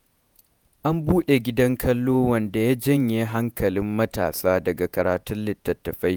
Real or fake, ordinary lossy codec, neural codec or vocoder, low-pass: fake; none; vocoder, 48 kHz, 128 mel bands, Vocos; none